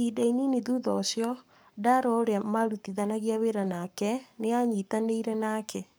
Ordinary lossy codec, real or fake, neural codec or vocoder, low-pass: none; fake; codec, 44.1 kHz, 7.8 kbps, Pupu-Codec; none